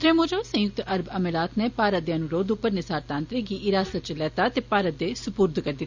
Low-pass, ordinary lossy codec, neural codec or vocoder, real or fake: 7.2 kHz; Opus, 64 kbps; none; real